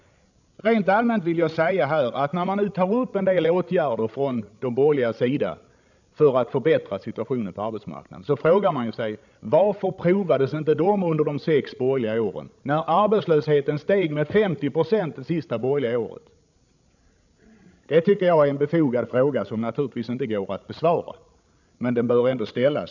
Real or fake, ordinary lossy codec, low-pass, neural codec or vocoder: fake; none; 7.2 kHz; codec, 16 kHz, 16 kbps, FreqCodec, larger model